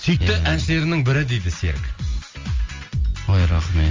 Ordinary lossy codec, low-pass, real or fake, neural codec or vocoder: Opus, 32 kbps; 7.2 kHz; real; none